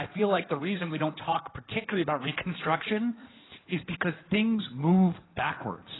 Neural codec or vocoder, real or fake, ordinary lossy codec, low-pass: codec, 16 kHz in and 24 kHz out, 2.2 kbps, FireRedTTS-2 codec; fake; AAC, 16 kbps; 7.2 kHz